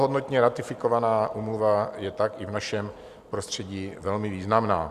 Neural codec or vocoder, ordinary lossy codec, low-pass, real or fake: none; Opus, 64 kbps; 14.4 kHz; real